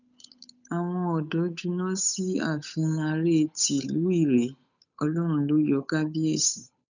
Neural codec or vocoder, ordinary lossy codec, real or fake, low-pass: codec, 16 kHz, 8 kbps, FunCodec, trained on Chinese and English, 25 frames a second; none; fake; 7.2 kHz